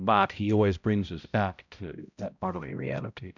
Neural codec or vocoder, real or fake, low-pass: codec, 16 kHz, 0.5 kbps, X-Codec, HuBERT features, trained on balanced general audio; fake; 7.2 kHz